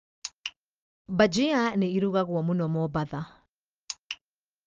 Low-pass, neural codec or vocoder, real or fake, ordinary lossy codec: 7.2 kHz; none; real; Opus, 24 kbps